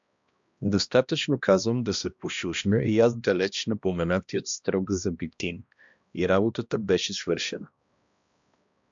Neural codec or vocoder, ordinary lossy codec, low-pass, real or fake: codec, 16 kHz, 1 kbps, X-Codec, HuBERT features, trained on balanced general audio; MP3, 64 kbps; 7.2 kHz; fake